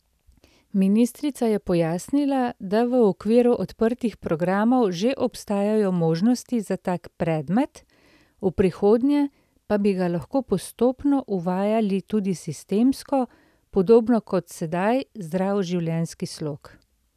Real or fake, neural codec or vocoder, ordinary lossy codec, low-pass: real; none; none; 14.4 kHz